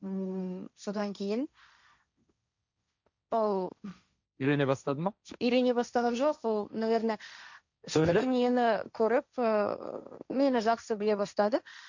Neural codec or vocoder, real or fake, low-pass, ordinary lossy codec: codec, 16 kHz, 1.1 kbps, Voila-Tokenizer; fake; 7.2 kHz; none